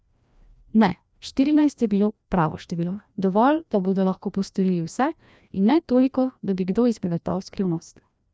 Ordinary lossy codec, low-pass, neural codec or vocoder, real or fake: none; none; codec, 16 kHz, 1 kbps, FreqCodec, larger model; fake